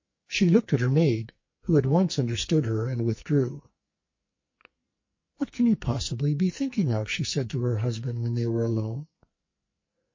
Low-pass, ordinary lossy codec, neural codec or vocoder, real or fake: 7.2 kHz; MP3, 32 kbps; codec, 44.1 kHz, 2.6 kbps, SNAC; fake